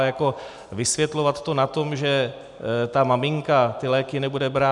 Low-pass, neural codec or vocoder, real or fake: 10.8 kHz; none; real